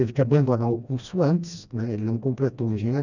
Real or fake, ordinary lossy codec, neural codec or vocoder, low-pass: fake; none; codec, 16 kHz, 1 kbps, FreqCodec, smaller model; 7.2 kHz